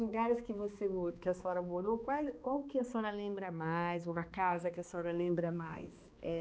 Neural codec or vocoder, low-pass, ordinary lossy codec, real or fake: codec, 16 kHz, 2 kbps, X-Codec, HuBERT features, trained on balanced general audio; none; none; fake